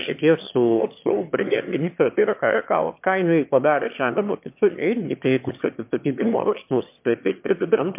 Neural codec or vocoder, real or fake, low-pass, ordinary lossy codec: autoencoder, 22.05 kHz, a latent of 192 numbers a frame, VITS, trained on one speaker; fake; 3.6 kHz; MP3, 32 kbps